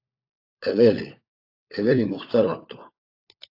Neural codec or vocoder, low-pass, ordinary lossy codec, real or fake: codec, 16 kHz, 4 kbps, FunCodec, trained on LibriTTS, 50 frames a second; 5.4 kHz; AAC, 32 kbps; fake